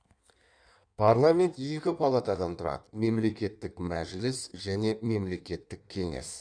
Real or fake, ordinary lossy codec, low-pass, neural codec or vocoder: fake; none; 9.9 kHz; codec, 16 kHz in and 24 kHz out, 1.1 kbps, FireRedTTS-2 codec